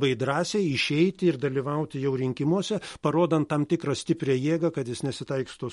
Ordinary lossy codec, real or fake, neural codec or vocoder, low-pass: MP3, 48 kbps; real; none; 19.8 kHz